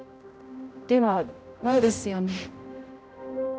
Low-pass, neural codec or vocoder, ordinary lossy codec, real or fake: none; codec, 16 kHz, 0.5 kbps, X-Codec, HuBERT features, trained on general audio; none; fake